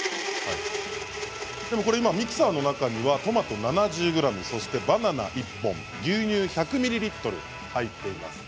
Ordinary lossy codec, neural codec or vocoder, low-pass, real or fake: none; none; none; real